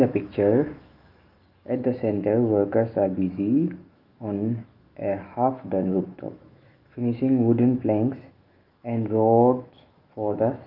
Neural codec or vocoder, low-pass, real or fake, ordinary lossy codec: autoencoder, 48 kHz, 128 numbers a frame, DAC-VAE, trained on Japanese speech; 5.4 kHz; fake; Opus, 32 kbps